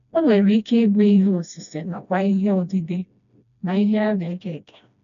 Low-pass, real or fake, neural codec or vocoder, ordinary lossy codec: 7.2 kHz; fake; codec, 16 kHz, 1 kbps, FreqCodec, smaller model; none